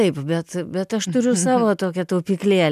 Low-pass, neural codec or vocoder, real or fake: 14.4 kHz; none; real